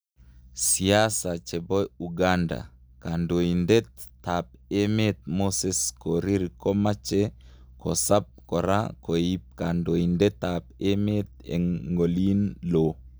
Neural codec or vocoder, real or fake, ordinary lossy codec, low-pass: none; real; none; none